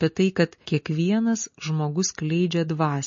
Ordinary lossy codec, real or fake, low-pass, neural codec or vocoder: MP3, 32 kbps; real; 7.2 kHz; none